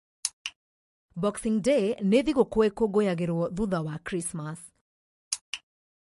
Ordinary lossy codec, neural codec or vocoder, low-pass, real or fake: MP3, 48 kbps; none; 14.4 kHz; real